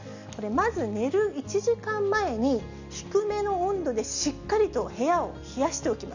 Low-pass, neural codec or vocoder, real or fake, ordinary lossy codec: 7.2 kHz; none; real; none